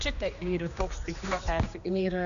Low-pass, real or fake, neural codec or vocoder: 7.2 kHz; fake; codec, 16 kHz, 2 kbps, X-Codec, HuBERT features, trained on general audio